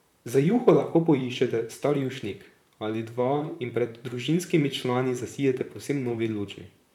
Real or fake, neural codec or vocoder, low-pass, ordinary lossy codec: fake; vocoder, 44.1 kHz, 128 mel bands, Pupu-Vocoder; 19.8 kHz; none